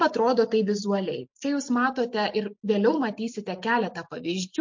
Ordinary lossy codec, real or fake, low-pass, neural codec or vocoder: MP3, 48 kbps; real; 7.2 kHz; none